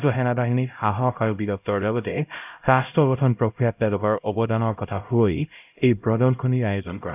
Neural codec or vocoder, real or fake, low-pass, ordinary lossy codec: codec, 16 kHz, 0.5 kbps, X-Codec, HuBERT features, trained on LibriSpeech; fake; 3.6 kHz; none